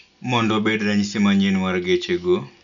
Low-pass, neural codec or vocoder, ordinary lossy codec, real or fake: 7.2 kHz; none; none; real